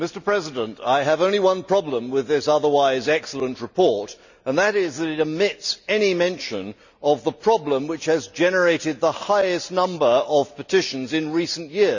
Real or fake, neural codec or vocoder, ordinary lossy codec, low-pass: real; none; none; 7.2 kHz